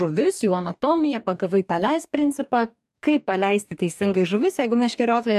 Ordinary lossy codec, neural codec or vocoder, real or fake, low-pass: AAC, 96 kbps; codec, 44.1 kHz, 2.6 kbps, DAC; fake; 14.4 kHz